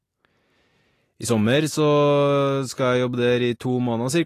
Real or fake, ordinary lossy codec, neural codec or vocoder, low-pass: real; AAC, 48 kbps; none; 14.4 kHz